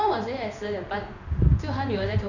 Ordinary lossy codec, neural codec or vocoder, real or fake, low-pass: none; codec, 16 kHz in and 24 kHz out, 1 kbps, XY-Tokenizer; fake; 7.2 kHz